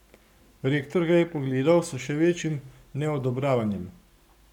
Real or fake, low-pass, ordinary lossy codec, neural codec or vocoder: fake; 19.8 kHz; none; codec, 44.1 kHz, 7.8 kbps, Pupu-Codec